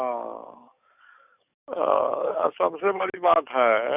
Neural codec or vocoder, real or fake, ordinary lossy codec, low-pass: codec, 44.1 kHz, 7.8 kbps, DAC; fake; none; 3.6 kHz